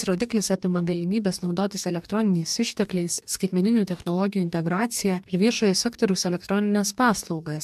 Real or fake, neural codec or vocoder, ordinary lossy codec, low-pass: fake; codec, 44.1 kHz, 2.6 kbps, DAC; MP3, 96 kbps; 14.4 kHz